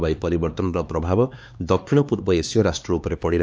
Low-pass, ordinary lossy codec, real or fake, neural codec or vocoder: none; none; fake; codec, 16 kHz, 2 kbps, X-Codec, HuBERT features, trained on LibriSpeech